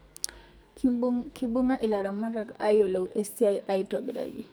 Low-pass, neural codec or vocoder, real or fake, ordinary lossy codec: none; codec, 44.1 kHz, 2.6 kbps, SNAC; fake; none